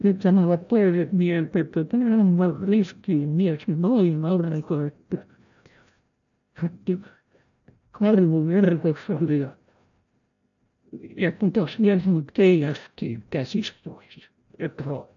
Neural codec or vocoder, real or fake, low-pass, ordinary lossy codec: codec, 16 kHz, 0.5 kbps, FreqCodec, larger model; fake; 7.2 kHz; none